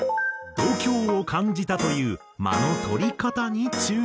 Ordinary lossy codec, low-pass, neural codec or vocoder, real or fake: none; none; none; real